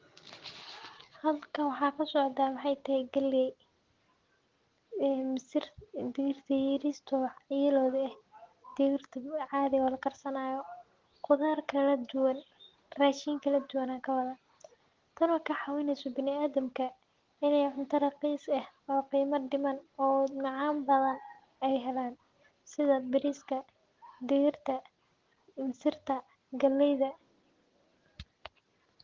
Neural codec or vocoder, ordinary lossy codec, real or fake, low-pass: none; Opus, 16 kbps; real; 7.2 kHz